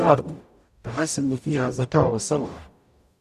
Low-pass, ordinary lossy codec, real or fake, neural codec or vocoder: 14.4 kHz; none; fake; codec, 44.1 kHz, 0.9 kbps, DAC